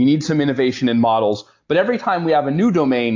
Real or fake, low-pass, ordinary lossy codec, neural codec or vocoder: real; 7.2 kHz; AAC, 48 kbps; none